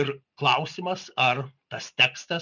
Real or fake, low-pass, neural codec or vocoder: real; 7.2 kHz; none